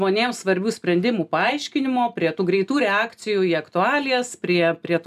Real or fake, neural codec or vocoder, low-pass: real; none; 14.4 kHz